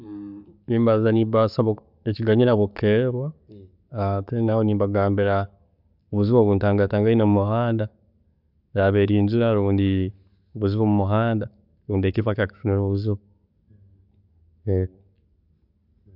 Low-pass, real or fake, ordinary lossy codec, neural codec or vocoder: 5.4 kHz; real; none; none